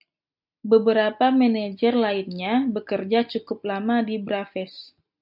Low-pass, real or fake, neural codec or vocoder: 5.4 kHz; real; none